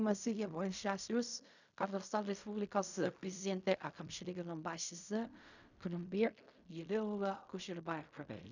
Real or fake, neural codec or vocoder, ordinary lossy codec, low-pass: fake; codec, 16 kHz in and 24 kHz out, 0.4 kbps, LongCat-Audio-Codec, fine tuned four codebook decoder; none; 7.2 kHz